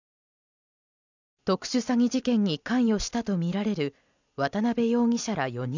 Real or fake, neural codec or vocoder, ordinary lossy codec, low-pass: fake; vocoder, 44.1 kHz, 128 mel bands every 256 samples, BigVGAN v2; none; 7.2 kHz